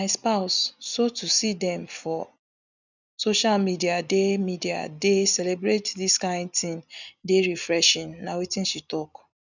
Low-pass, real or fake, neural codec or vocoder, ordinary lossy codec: 7.2 kHz; real; none; none